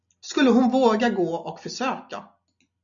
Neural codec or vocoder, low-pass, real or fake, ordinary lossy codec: none; 7.2 kHz; real; AAC, 64 kbps